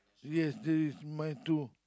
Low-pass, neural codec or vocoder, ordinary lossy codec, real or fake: none; none; none; real